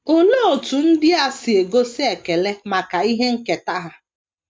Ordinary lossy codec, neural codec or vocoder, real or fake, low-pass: none; none; real; none